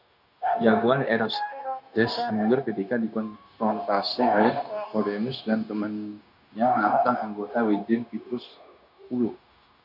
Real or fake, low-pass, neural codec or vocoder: fake; 5.4 kHz; codec, 16 kHz, 0.9 kbps, LongCat-Audio-Codec